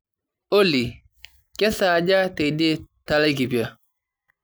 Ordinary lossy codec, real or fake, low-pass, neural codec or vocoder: none; real; none; none